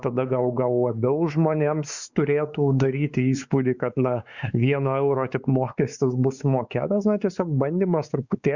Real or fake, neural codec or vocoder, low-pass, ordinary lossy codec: fake; codec, 16 kHz, 4 kbps, X-Codec, WavLM features, trained on Multilingual LibriSpeech; 7.2 kHz; Opus, 64 kbps